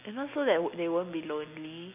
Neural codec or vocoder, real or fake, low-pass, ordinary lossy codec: none; real; 3.6 kHz; none